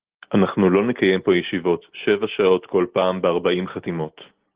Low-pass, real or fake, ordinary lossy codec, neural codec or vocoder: 3.6 kHz; real; Opus, 16 kbps; none